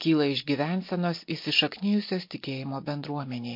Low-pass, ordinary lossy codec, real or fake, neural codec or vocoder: 5.4 kHz; MP3, 32 kbps; real; none